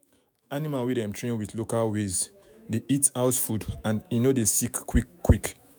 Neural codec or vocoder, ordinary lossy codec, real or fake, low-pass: autoencoder, 48 kHz, 128 numbers a frame, DAC-VAE, trained on Japanese speech; none; fake; none